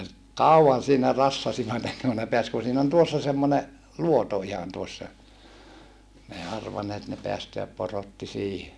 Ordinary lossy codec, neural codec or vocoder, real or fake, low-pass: none; none; real; none